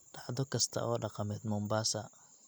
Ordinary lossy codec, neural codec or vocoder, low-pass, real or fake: none; none; none; real